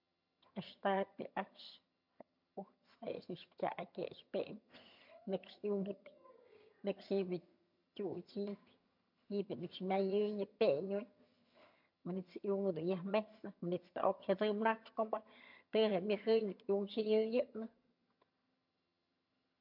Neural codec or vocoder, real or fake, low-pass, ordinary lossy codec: vocoder, 22.05 kHz, 80 mel bands, HiFi-GAN; fake; 5.4 kHz; MP3, 48 kbps